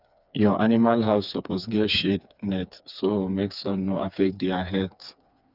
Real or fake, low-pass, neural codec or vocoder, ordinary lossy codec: fake; 5.4 kHz; codec, 16 kHz, 4 kbps, FreqCodec, smaller model; none